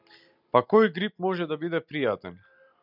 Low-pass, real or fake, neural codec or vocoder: 5.4 kHz; real; none